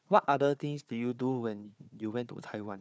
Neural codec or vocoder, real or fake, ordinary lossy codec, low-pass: codec, 16 kHz, 4 kbps, FunCodec, trained on Chinese and English, 50 frames a second; fake; none; none